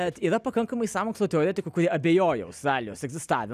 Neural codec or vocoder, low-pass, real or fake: none; 14.4 kHz; real